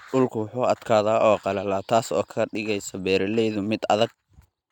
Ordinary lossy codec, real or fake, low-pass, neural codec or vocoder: none; real; 19.8 kHz; none